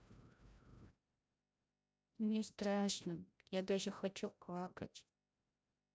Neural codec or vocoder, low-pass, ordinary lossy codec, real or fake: codec, 16 kHz, 0.5 kbps, FreqCodec, larger model; none; none; fake